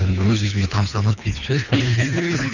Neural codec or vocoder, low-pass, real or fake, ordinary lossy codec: codec, 24 kHz, 3 kbps, HILCodec; 7.2 kHz; fake; none